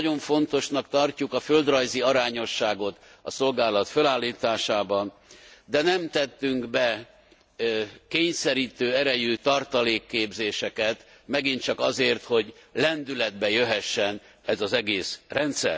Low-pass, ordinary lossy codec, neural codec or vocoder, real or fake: none; none; none; real